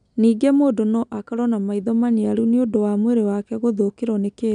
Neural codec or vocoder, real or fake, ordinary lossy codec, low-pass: none; real; none; 9.9 kHz